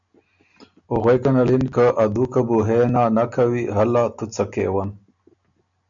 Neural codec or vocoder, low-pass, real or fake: none; 7.2 kHz; real